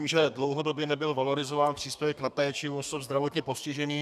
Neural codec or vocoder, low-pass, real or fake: codec, 32 kHz, 1.9 kbps, SNAC; 14.4 kHz; fake